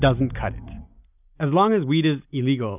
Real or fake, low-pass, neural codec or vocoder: real; 3.6 kHz; none